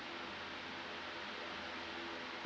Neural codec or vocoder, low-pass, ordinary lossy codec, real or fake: none; none; none; real